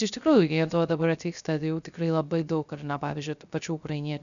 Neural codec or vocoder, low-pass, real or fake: codec, 16 kHz, 0.3 kbps, FocalCodec; 7.2 kHz; fake